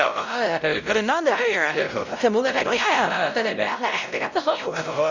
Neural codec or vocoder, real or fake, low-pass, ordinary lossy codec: codec, 16 kHz, 0.5 kbps, X-Codec, WavLM features, trained on Multilingual LibriSpeech; fake; 7.2 kHz; none